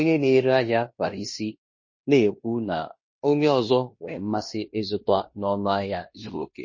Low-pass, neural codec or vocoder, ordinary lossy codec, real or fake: 7.2 kHz; codec, 16 kHz in and 24 kHz out, 0.9 kbps, LongCat-Audio-Codec, fine tuned four codebook decoder; MP3, 32 kbps; fake